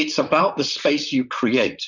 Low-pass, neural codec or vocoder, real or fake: 7.2 kHz; vocoder, 44.1 kHz, 128 mel bands, Pupu-Vocoder; fake